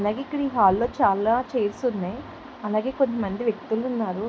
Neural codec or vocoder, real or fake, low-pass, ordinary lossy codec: none; real; none; none